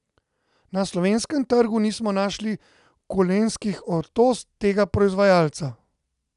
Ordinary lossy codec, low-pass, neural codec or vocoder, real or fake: none; 10.8 kHz; none; real